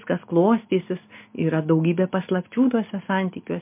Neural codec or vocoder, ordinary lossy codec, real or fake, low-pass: none; MP3, 32 kbps; real; 3.6 kHz